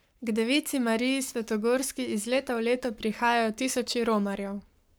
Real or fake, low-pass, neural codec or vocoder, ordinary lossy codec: fake; none; codec, 44.1 kHz, 7.8 kbps, Pupu-Codec; none